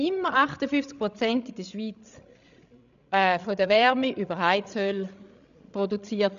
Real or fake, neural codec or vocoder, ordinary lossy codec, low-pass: fake; codec, 16 kHz, 16 kbps, FreqCodec, larger model; none; 7.2 kHz